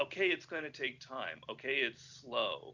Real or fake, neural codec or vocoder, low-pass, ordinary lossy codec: real; none; 7.2 kHz; Opus, 64 kbps